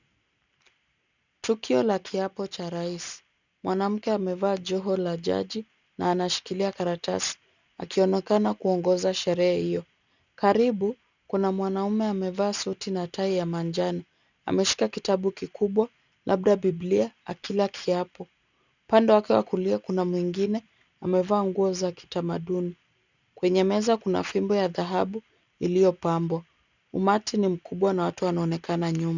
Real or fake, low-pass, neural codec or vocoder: real; 7.2 kHz; none